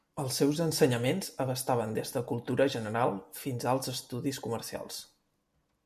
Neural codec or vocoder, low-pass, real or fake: none; 14.4 kHz; real